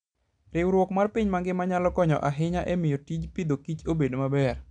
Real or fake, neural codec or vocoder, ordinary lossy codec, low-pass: real; none; MP3, 96 kbps; 9.9 kHz